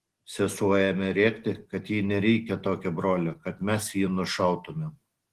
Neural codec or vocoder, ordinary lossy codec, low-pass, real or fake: none; Opus, 16 kbps; 14.4 kHz; real